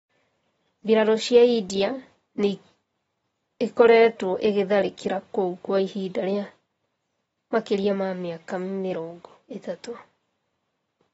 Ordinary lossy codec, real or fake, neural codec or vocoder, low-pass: AAC, 24 kbps; real; none; 19.8 kHz